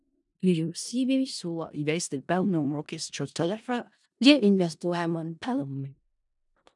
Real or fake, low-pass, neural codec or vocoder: fake; 10.8 kHz; codec, 16 kHz in and 24 kHz out, 0.4 kbps, LongCat-Audio-Codec, four codebook decoder